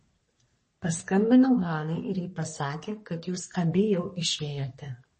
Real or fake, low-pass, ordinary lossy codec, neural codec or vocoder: fake; 10.8 kHz; MP3, 32 kbps; codec, 44.1 kHz, 3.4 kbps, Pupu-Codec